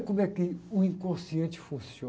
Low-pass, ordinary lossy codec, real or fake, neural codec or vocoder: none; none; real; none